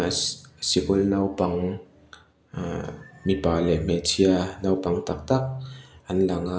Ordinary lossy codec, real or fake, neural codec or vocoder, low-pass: none; real; none; none